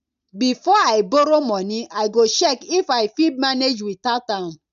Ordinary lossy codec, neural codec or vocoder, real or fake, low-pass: none; none; real; 7.2 kHz